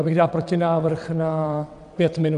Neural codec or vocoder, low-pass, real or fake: vocoder, 22.05 kHz, 80 mel bands, WaveNeXt; 9.9 kHz; fake